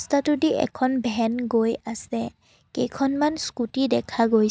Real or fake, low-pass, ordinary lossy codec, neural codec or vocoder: real; none; none; none